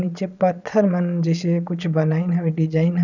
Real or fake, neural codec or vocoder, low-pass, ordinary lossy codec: fake; vocoder, 22.05 kHz, 80 mel bands, Vocos; 7.2 kHz; none